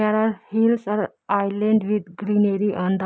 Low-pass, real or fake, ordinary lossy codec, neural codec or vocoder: none; real; none; none